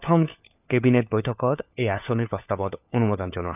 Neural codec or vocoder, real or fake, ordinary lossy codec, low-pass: codec, 16 kHz, 4 kbps, FunCodec, trained on LibriTTS, 50 frames a second; fake; none; 3.6 kHz